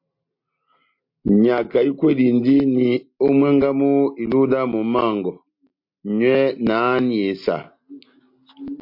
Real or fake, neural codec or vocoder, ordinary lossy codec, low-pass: real; none; MP3, 32 kbps; 5.4 kHz